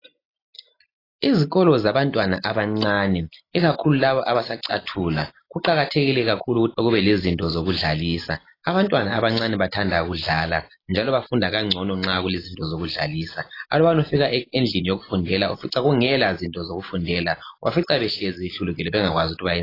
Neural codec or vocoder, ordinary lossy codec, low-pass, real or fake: none; AAC, 24 kbps; 5.4 kHz; real